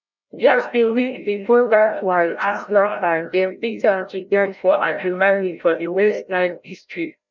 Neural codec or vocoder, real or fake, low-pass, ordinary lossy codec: codec, 16 kHz, 0.5 kbps, FreqCodec, larger model; fake; 7.2 kHz; none